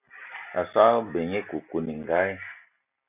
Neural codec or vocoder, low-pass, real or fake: none; 3.6 kHz; real